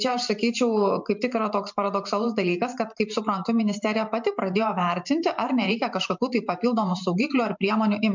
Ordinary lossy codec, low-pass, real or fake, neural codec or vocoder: MP3, 64 kbps; 7.2 kHz; fake; vocoder, 44.1 kHz, 128 mel bands every 512 samples, BigVGAN v2